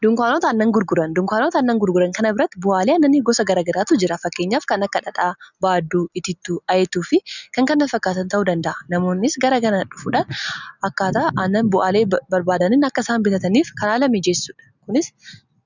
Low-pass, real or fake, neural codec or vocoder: 7.2 kHz; real; none